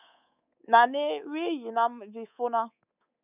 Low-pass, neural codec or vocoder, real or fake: 3.6 kHz; codec, 24 kHz, 3.1 kbps, DualCodec; fake